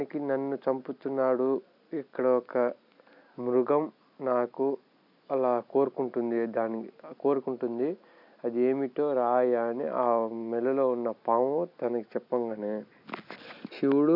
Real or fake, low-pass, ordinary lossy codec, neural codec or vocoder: real; 5.4 kHz; none; none